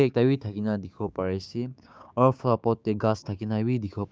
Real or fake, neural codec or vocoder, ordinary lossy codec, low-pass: fake; codec, 16 kHz, 6 kbps, DAC; none; none